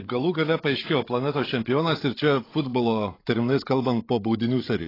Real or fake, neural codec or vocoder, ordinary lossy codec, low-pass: fake; codec, 16 kHz, 16 kbps, FreqCodec, larger model; AAC, 24 kbps; 5.4 kHz